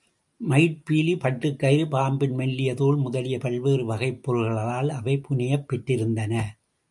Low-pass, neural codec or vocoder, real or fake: 10.8 kHz; none; real